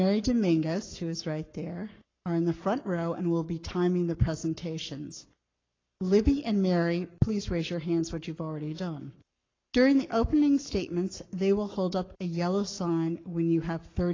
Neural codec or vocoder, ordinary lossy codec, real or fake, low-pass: codec, 44.1 kHz, 7.8 kbps, Pupu-Codec; AAC, 32 kbps; fake; 7.2 kHz